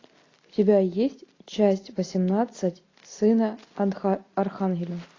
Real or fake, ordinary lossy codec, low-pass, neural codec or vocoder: real; AAC, 32 kbps; 7.2 kHz; none